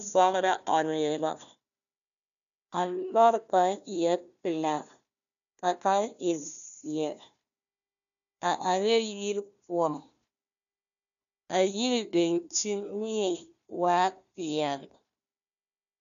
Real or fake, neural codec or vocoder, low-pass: fake; codec, 16 kHz, 1 kbps, FunCodec, trained on Chinese and English, 50 frames a second; 7.2 kHz